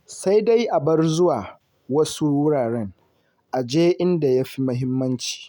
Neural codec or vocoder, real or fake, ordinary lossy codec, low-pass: none; real; none; 19.8 kHz